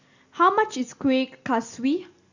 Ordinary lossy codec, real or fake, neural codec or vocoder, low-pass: Opus, 64 kbps; real; none; 7.2 kHz